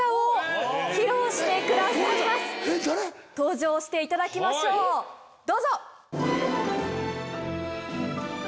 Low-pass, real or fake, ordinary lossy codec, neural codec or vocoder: none; real; none; none